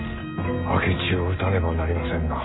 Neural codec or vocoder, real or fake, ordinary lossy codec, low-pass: none; real; AAC, 16 kbps; 7.2 kHz